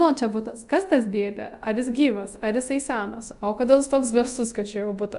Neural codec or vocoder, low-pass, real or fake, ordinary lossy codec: codec, 24 kHz, 0.5 kbps, DualCodec; 10.8 kHz; fake; MP3, 96 kbps